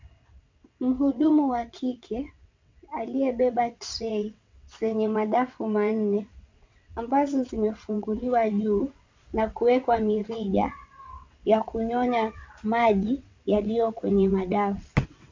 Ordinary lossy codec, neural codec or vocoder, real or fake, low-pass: MP3, 48 kbps; vocoder, 22.05 kHz, 80 mel bands, WaveNeXt; fake; 7.2 kHz